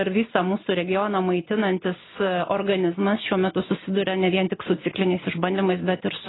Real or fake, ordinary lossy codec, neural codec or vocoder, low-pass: real; AAC, 16 kbps; none; 7.2 kHz